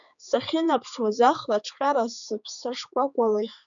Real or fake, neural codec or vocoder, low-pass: fake; codec, 16 kHz, 4 kbps, X-Codec, HuBERT features, trained on general audio; 7.2 kHz